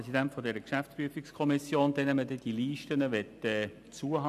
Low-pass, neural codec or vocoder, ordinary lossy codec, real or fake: 14.4 kHz; none; none; real